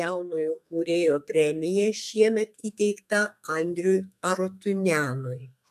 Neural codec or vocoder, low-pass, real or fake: codec, 32 kHz, 1.9 kbps, SNAC; 14.4 kHz; fake